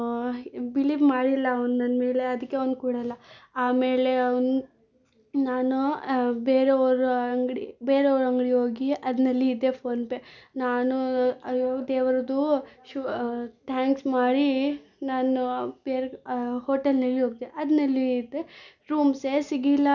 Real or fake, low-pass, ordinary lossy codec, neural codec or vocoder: real; 7.2 kHz; none; none